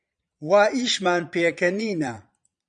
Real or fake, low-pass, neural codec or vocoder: fake; 9.9 kHz; vocoder, 22.05 kHz, 80 mel bands, Vocos